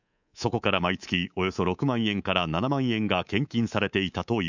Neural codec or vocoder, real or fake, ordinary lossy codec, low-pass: codec, 24 kHz, 3.1 kbps, DualCodec; fake; none; 7.2 kHz